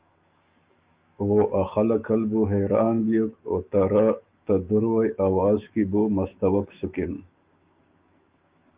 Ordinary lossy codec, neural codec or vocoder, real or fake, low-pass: Opus, 32 kbps; autoencoder, 48 kHz, 128 numbers a frame, DAC-VAE, trained on Japanese speech; fake; 3.6 kHz